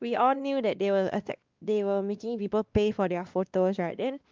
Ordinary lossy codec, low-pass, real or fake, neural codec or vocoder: Opus, 24 kbps; 7.2 kHz; fake; codec, 16 kHz, 2 kbps, X-Codec, WavLM features, trained on Multilingual LibriSpeech